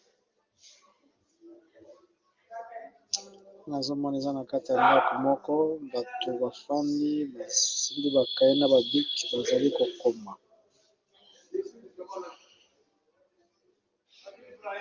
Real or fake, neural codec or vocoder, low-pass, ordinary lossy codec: real; none; 7.2 kHz; Opus, 24 kbps